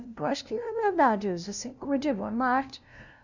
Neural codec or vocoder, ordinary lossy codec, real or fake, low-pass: codec, 16 kHz, 0.5 kbps, FunCodec, trained on LibriTTS, 25 frames a second; none; fake; 7.2 kHz